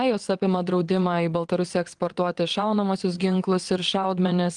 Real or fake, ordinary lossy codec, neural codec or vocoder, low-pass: fake; Opus, 24 kbps; vocoder, 22.05 kHz, 80 mel bands, WaveNeXt; 9.9 kHz